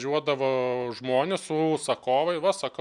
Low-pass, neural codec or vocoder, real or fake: 10.8 kHz; none; real